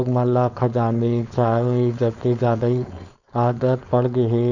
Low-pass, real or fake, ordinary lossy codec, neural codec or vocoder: 7.2 kHz; fake; none; codec, 16 kHz, 4.8 kbps, FACodec